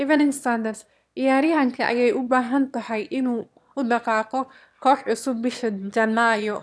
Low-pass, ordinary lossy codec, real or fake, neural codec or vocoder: none; none; fake; autoencoder, 22.05 kHz, a latent of 192 numbers a frame, VITS, trained on one speaker